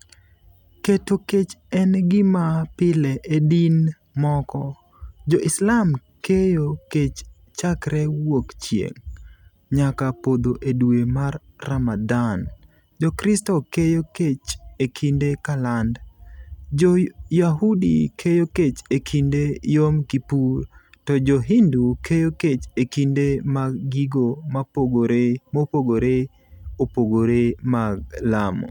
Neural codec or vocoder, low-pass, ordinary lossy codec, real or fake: none; 19.8 kHz; none; real